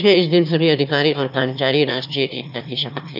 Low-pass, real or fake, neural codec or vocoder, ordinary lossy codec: 5.4 kHz; fake; autoencoder, 22.05 kHz, a latent of 192 numbers a frame, VITS, trained on one speaker; none